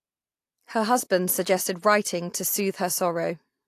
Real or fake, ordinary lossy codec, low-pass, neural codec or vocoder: real; AAC, 64 kbps; 14.4 kHz; none